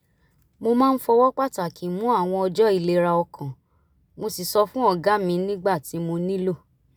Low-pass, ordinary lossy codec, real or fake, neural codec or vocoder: none; none; real; none